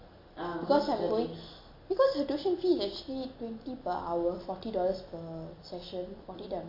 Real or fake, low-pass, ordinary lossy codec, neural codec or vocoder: real; 5.4 kHz; MP3, 32 kbps; none